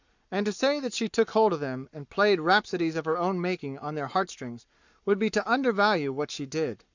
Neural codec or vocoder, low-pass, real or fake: codec, 44.1 kHz, 7.8 kbps, Pupu-Codec; 7.2 kHz; fake